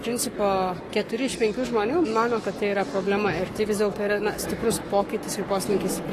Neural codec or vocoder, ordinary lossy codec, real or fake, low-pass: codec, 44.1 kHz, 7.8 kbps, Pupu-Codec; AAC, 48 kbps; fake; 14.4 kHz